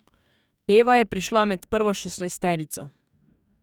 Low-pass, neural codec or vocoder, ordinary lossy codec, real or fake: 19.8 kHz; codec, 44.1 kHz, 2.6 kbps, DAC; none; fake